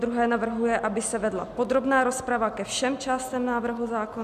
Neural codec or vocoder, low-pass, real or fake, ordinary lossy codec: none; 14.4 kHz; real; MP3, 96 kbps